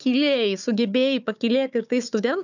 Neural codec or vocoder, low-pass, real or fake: codec, 16 kHz, 4 kbps, FunCodec, trained on Chinese and English, 50 frames a second; 7.2 kHz; fake